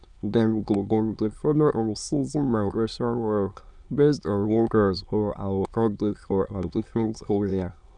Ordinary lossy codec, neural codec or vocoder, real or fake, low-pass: none; autoencoder, 22.05 kHz, a latent of 192 numbers a frame, VITS, trained on many speakers; fake; 9.9 kHz